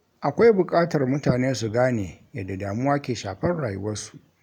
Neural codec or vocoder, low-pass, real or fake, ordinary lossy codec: none; 19.8 kHz; real; none